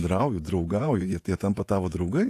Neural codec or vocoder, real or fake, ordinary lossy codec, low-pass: vocoder, 48 kHz, 128 mel bands, Vocos; fake; AAC, 64 kbps; 14.4 kHz